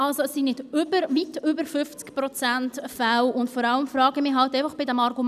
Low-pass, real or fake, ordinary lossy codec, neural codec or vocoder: 14.4 kHz; real; none; none